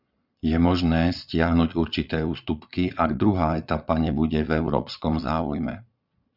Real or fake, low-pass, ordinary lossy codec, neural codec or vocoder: fake; 5.4 kHz; Opus, 64 kbps; vocoder, 22.05 kHz, 80 mel bands, Vocos